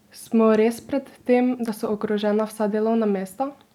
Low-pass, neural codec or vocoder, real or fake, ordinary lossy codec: 19.8 kHz; none; real; none